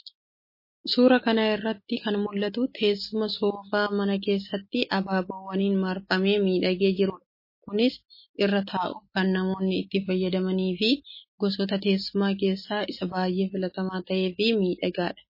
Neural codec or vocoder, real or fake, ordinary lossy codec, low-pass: none; real; MP3, 24 kbps; 5.4 kHz